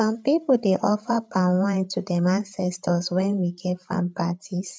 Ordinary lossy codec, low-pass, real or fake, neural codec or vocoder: none; none; fake; codec, 16 kHz, 8 kbps, FreqCodec, larger model